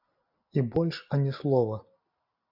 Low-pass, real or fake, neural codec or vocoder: 5.4 kHz; real; none